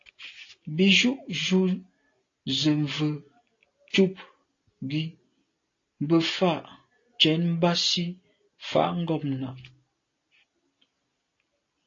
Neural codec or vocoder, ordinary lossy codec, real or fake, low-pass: none; AAC, 32 kbps; real; 7.2 kHz